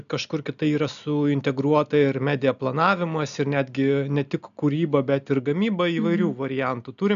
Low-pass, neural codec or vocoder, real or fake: 7.2 kHz; none; real